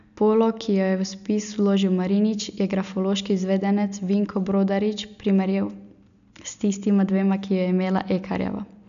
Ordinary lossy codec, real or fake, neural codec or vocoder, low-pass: none; real; none; 7.2 kHz